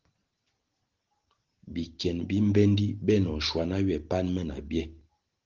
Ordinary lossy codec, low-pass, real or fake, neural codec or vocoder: Opus, 16 kbps; 7.2 kHz; real; none